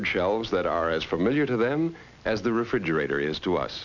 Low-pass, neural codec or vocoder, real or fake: 7.2 kHz; none; real